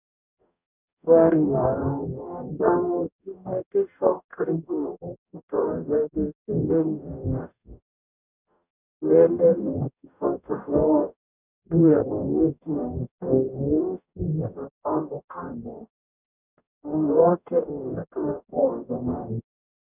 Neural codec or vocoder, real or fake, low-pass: codec, 44.1 kHz, 0.9 kbps, DAC; fake; 3.6 kHz